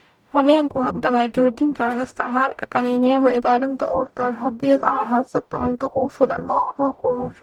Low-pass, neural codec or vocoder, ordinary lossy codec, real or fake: 19.8 kHz; codec, 44.1 kHz, 0.9 kbps, DAC; none; fake